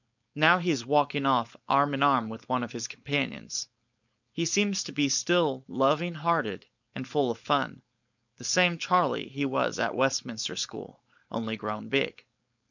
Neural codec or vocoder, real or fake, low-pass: codec, 16 kHz, 4.8 kbps, FACodec; fake; 7.2 kHz